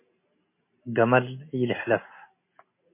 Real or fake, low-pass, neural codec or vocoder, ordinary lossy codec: real; 3.6 kHz; none; MP3, 24 kbps